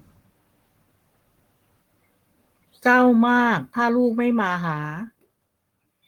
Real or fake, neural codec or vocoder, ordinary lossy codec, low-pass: fake; codec, 44.1 kHz, 7.8 kbps, Pupu-Codec; Opus, 24 kbps; 19.8 kHz